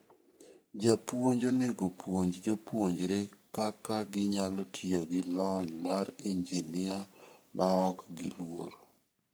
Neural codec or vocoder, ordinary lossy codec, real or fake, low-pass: codec, 44.1 kHz, 3.4 kbps, Pupu-Codec; none; fake; none